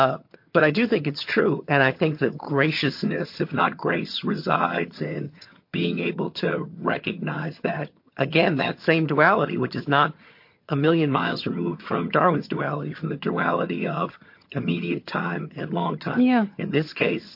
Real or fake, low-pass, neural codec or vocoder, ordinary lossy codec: fake; 5.4 kHz; vocoder, 22.05 kHz, 80 mel bands, HiFi-GAN; MP3, 32 kbps